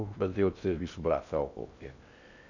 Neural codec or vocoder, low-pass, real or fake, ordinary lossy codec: codec, 16 kHz in and 24 kHz out, 0.6 kbps, FocalCodec, streaming, 2048 codes; 7.2 kHz; fake; none